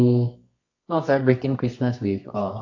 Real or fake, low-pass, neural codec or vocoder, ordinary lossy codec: fake; 7.2 kHz; codec, 24 kHz, 0.9 kbps, WavTokenizer, medium music audio release; AAC, 48 kbps